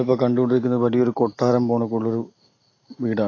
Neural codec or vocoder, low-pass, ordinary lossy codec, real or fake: none; 7.2 kHz; AAC, 32 kbps; real